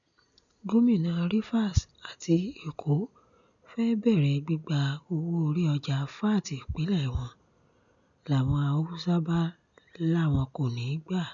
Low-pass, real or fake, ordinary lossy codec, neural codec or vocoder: 7.2 kHz; real; none; none